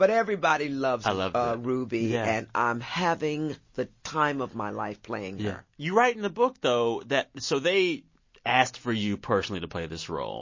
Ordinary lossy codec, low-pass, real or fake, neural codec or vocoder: MP3, 32 kbps; 7.2 kHz; real; none